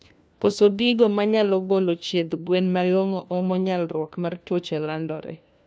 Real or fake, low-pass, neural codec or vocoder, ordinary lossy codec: fake; none; codec, 16 kHz, 1 kbps, FunCodec, trained on LibriTTS, 50 frames a second; none